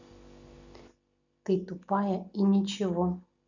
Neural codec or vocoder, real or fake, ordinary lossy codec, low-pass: none; real; none; 7.2 kHz